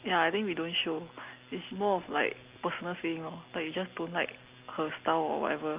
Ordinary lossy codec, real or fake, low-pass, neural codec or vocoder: Opus, 32 kbps; real; 3.6 kHz; none